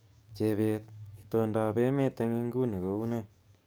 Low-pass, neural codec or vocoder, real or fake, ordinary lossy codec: none; codec, 44.1 kHz, 7.8 kbps, DAC; fake; none